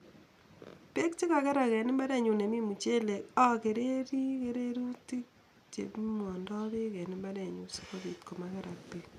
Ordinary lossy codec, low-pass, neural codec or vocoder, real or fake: none; 14.4 kHz; none; real